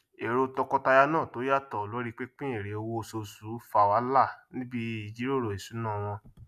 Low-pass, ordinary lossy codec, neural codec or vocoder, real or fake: 14.4 kHz; none; none; real